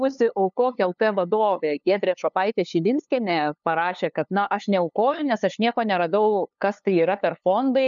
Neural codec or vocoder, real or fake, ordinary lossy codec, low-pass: codec, 16 kHz, 2 kbps, FunCodec, trained on LibriTTS, 25 frames a second; fake; MP3, 96 kbps; 7.2 kHz